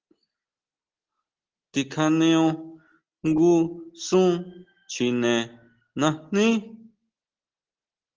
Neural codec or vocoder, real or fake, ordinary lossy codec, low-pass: none; real; Opus, 16 kbps; 7.2 kHz